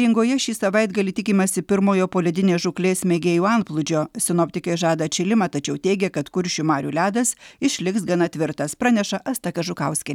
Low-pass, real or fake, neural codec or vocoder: 19.8 kHz; real; none